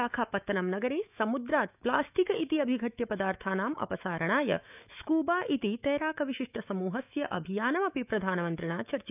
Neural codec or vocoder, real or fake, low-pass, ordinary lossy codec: autoencoder, 48 kHz, 128 numbers a frame, DAC-VAE, trained on Japanese speech; fake; 3.6 kHz; none